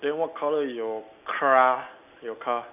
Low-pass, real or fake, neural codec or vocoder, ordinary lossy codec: 3.6 kHz; real; none; none